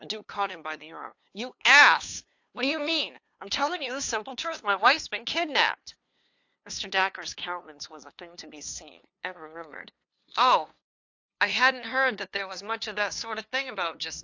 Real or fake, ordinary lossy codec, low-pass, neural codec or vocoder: fake; AAC, 48 kbps; 7.2 kHz; codec, 16 kHz, 2 kbps, FunCodec, trained on LibriTTS, 25 frames a second